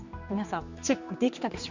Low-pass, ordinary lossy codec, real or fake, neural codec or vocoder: 7.2 kHz; Opus, 64 kbps; fake; codec, 16 kHz, 2 kbps, X-Codec, HuBERT features, trained on general audio